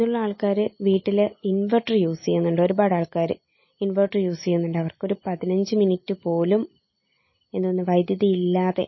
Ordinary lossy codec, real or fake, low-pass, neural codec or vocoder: MP3, 24 kbps; real; 7.2 kHz; none